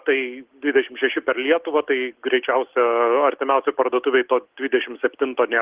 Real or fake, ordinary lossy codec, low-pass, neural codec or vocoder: real; Opus, 16 kbps; 3.6 kHz; none